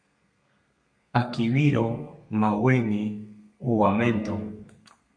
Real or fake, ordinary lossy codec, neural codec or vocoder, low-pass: fake; MP3, 64 kbps; codec, 32 kHz, 1.9 kbps, SNAC; 9.9 kHz